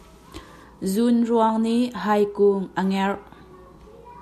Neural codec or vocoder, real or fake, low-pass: none; real; 14.4 kHz